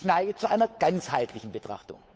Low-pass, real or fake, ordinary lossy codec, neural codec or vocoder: none; fake; none; codec, 16 kHz, 8 kbps, FunCodec, trained on Chinese and English, 25 frames a second